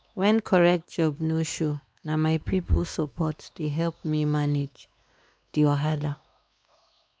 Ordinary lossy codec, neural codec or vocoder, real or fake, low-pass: none; codec, 16 kHz, 2 kbps, X-Codec, WavLM features, trained on Multilingual LibriSpeech; fake; none